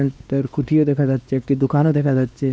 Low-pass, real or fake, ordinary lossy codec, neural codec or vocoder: none; fake; none; codec, 16 kHz, 2 kbps, X-Codec, WavLM features, trained on Multilingual LibriSpeech